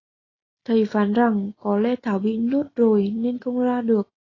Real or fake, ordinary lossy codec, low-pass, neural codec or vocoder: real; AAC, 32 kbps; 7.2 kHz; none